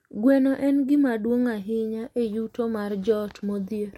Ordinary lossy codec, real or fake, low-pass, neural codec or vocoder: MP3, 64 kbps; real; 19.8 kHz; none